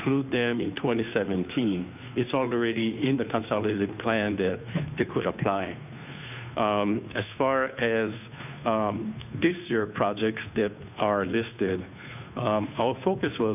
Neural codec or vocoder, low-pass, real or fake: codec, 16 kHz, 2 kbps, FunCodec, trained on Chinese and English, 25 frames a second; 3.6 kHz; fake